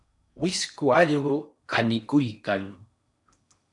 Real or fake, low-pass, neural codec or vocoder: fake; 10.8 kHz; codec, 16 kHz in and 24 kHz out, 0.8 kbps, FocalCodec, streaming, 65536 codes